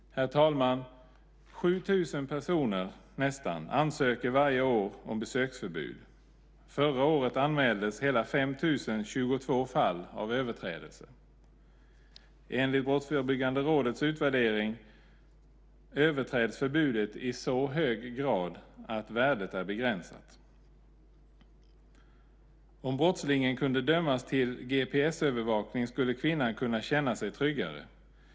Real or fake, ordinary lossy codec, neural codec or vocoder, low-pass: real; none; none; none